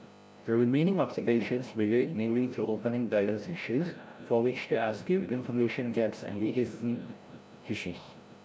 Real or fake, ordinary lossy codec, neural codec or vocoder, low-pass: fake; none; codec, 16 kHz, 0.5 kbps, FreqCodec, larger model; none